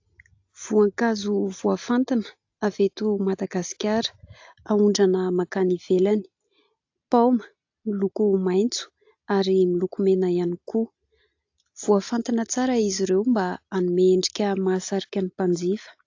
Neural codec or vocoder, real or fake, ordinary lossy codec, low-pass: none; real; AAC, 48 kbps; 7.2 kHz